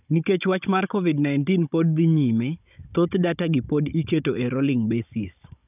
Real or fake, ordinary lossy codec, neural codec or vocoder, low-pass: fake; none; codec, 16 kHz, 16 kbps, FunCodec, trained on Chinese and English, 50 frames a second; 3.6 kHz